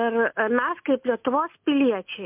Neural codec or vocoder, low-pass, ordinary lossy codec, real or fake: none; 3.6 kHz; MP3, 32 kbps; real